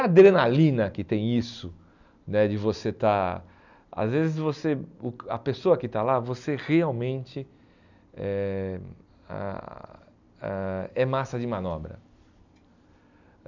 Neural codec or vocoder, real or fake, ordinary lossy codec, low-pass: none; real; none; 7.2 kHz